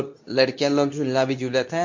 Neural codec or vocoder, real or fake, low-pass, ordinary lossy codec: codec, 24 kHz, 0.9 kbps, WavTokenizer, medium speech release version 2; fake; 7.2 kHz; MP3, 48 kbps